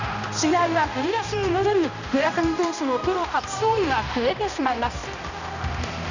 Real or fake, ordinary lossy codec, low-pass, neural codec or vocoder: fake; none; 7.2 kHz; codec, 16 kHz, 1 kbps, X-Codec, HuBERT features, trained on balanced general audio